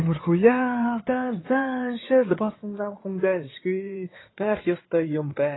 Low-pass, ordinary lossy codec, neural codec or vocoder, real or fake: 7.2 kHz; AAC, 16 kbps; codec, 16 kHz in and 24 kHz out, 2.2 kbps, FireRedTTS-2 codec; fake